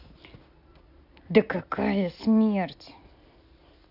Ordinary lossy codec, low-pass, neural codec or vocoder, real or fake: AAC, 48 kbps; 5.4 kHz; none; real